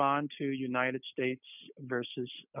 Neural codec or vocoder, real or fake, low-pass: none; real; 3.6 kHz